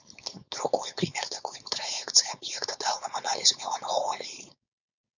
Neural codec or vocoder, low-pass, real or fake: codec, 16 kHz, 4.8 kbps, FACodec; 7.2 kHz; fake